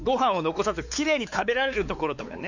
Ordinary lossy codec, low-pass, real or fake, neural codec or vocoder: none; 7.2 kHz; fake; codec, 16 kHz, 4 kbps, X-Codec, WavLM features, trained on Multilingual LibriSpeech